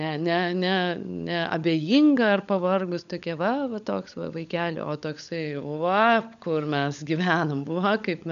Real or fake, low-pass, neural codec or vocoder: fake; 7.2 kHz; codec, 16 kHz, 16 kbps, FunCodec, trained on LibriTTS, 50 frames a second